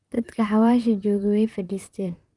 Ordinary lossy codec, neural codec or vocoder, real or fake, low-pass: Opus, 32 kbps; vocoder, 44.1 kHz, 128 mel bands, Pupu-Vocoder; fake; 10.8 kHz